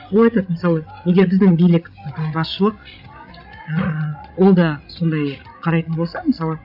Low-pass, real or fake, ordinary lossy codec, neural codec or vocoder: 5.4 kHz; fake; none; codec, 16 kHz, 16 kbps, FreqCodec, larger model